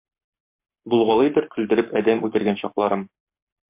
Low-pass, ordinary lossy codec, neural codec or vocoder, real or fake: 3.6 kHz; MP3, 32 kbps; codec, 44.1 kHz, 7.8 kbps, Pupu-Codec; fake